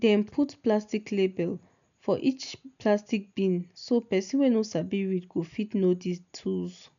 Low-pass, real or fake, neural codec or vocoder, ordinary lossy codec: 7.2 kHz; real; none; none